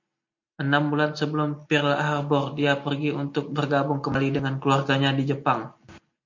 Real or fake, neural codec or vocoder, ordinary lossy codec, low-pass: real; none; MP3, 48 kbps; 7.2 kHz